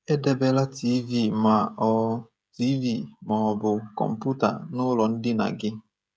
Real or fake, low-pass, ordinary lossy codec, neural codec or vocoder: fake; none; none; codec, 16 kHz, 16 kbps, FreqCodec, smaller model